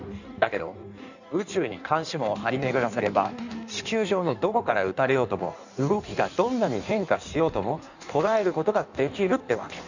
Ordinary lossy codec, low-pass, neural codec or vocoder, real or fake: none; 7.2 kHz; codec, 16 kHz in and 24 kHz out, 1.1 kbps, FireRedTTS-2 codec; fake